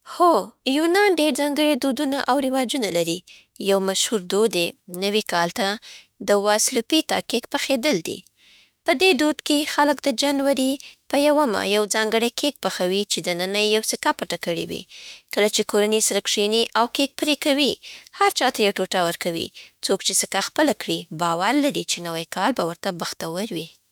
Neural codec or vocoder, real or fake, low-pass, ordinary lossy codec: autoencoder, 48 kHz, 32 numbers a frame, DAC-VAE, trained on Japanese speech; fake; none; none